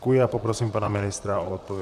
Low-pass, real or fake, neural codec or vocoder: 14.4 kHz; fake; vocoder, 44.1 kHz, 128 mel bands, Pupu-Vocoder